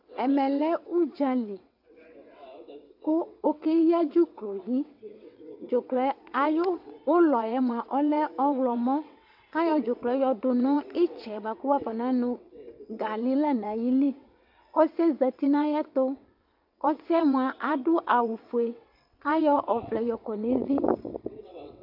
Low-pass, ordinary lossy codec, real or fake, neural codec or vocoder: 5.4 kHz; AAC, 48 kbps; fake; vocoder, 22.05 kHz, 80 mel bands, WaveNeXt